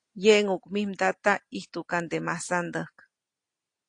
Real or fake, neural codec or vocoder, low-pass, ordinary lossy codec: real; none; 9.9 kHz; AAC, 48 kbps